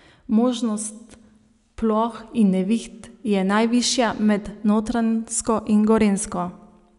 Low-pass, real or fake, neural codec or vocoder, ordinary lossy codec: 10.8 kHz; real; none; none